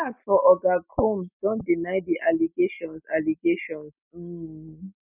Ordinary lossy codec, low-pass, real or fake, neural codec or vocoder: none; 3.6 kHz; real; none